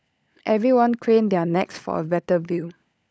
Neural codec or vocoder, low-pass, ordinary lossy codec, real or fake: codec, 16 kHz, 16 kbps, FunCodec, trained on LibriTTS, 50 frames a second; none; none; fake